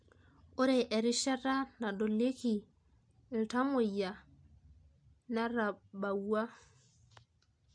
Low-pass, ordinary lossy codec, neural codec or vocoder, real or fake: 9.9 kHz; MP3, 64 kbps; none; real